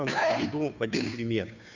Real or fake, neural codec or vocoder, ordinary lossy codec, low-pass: fake; codec, 16 kHz, 2 kbps, FunCodec, trained on Chinese and English, 25 frames a second; none; 7.2 kHz